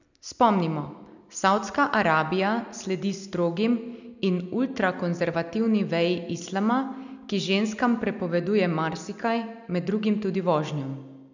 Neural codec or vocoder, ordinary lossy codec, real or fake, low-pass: none; none; real; 7.2 kHz